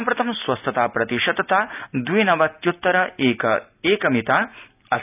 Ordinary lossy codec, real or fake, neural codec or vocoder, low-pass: none; real; none; 3.6 kHz